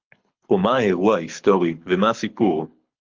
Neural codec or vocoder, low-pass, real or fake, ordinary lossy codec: codec, 24 kHz, 6 kbps, HILCodec; 7.2 kHz; fake; Opus, 24 kbps